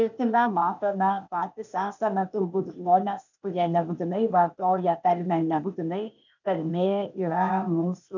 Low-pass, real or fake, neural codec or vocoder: 7.2 kHz; fake; codec, 16 kHz, 0.8 kbps, ZipCodec